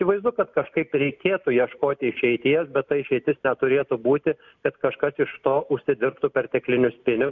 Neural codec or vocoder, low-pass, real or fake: none; 7.2 kHz; real